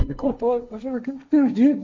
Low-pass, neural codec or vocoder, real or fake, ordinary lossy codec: 7.2 kHz; codec, 16 kHz, 1.1 kbps, Voila-Tokenizer; fake; MP3, 64 kbps